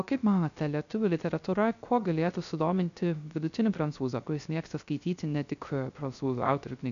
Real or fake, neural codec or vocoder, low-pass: fake; codec, 16 kHz, 0.3 kbps, FocalCodec; 7.2 kHz